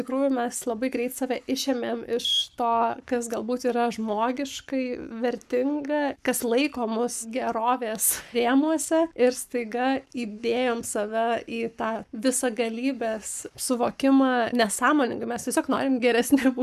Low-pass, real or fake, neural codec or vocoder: 14.4 kHz; fake; codec, 44.1 kHz, 7.8 kbps, Pupu-Codec